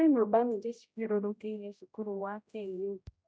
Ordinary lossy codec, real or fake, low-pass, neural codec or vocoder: none; fake; none; codec, 16 kHz, 0.5 kbps, X-Codec, HuBERT features, trained on general audio